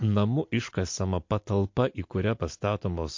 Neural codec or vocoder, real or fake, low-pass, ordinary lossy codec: none; real; 7.2 kHz; MP3, 48 kbps